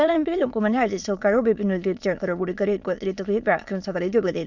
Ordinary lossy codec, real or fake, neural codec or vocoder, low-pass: Opus, 64 kbps; fake; autoencoder, 22.05 kHz, a latent of 192 numbers a frame, VITS, trained on many speakers; 7.2 kHz